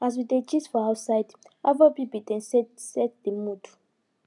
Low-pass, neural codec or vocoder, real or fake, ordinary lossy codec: 10.8 kHz; none; real; none